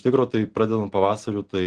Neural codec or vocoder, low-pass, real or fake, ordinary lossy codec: none; 9.9 kHz; real; Opus, 16 kbps